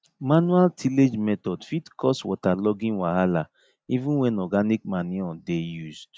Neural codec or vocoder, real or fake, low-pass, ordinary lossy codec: none; real; none; none